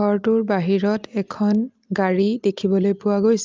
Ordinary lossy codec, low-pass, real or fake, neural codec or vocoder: Opus, 32 kbps; 7.2 kHz; real; none